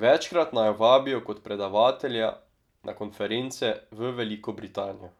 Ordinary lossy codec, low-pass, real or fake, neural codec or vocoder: none; 19.8 kHz; real; none